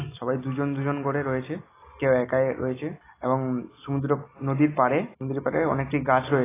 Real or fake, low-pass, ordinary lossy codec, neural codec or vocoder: real; 3.6 kHz; AAC, 16 kbps; none